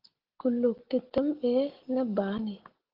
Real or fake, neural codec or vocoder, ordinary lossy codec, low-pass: real; none; Opus, 16 kbps; 5.4 kHz